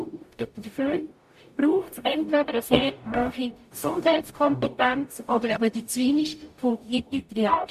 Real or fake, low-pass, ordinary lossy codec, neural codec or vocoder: fake; 14.4 kHz; MP3, 64 kbps; codec, 44.1 kHz, 0.9 kbps, DAC